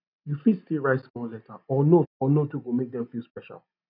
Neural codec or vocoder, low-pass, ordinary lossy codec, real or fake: none; 5.4 kHz; none; real